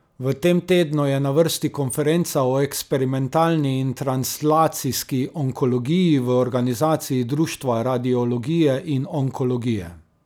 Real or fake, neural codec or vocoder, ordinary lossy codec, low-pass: real; none; none; none